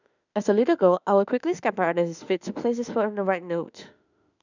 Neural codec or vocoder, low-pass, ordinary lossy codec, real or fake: autoencoder, 48 kHz, 32 numbers a frame, DAC-VAE, trained on Japanese speech; 7.2 kHz; none; fake